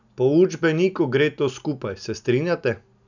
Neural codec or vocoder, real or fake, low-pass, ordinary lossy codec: none; real; 7.2 kHz; none